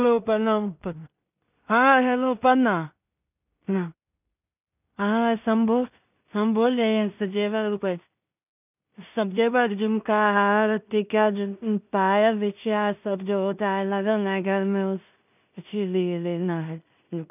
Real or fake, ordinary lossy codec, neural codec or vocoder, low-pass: fake; none; codec, 16 kHz in and 24 kHz out, 0.4 kbps, LongCat-Audio-Codec, two codebook decoder; 3.6 kHz